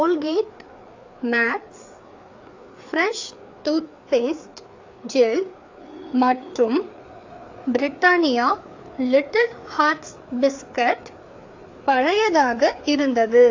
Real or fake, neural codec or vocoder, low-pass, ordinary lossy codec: fake; codec, 16 kHz, 4 kbps, FreqCodec, larger model; 7.2 kHz; AAC, 48 kbps